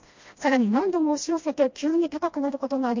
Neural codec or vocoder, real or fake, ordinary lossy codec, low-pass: codec, 16 kHz, 1 kbps, FreqCodec, smaller model; fake; MP3, 48 kbps; 7.2 kHz